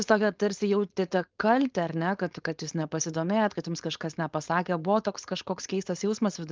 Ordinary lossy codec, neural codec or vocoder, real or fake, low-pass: Opus, 32 kbps; codec, 16 kHz, 4.8 kbps, FACodec; fake; 7.2 kHz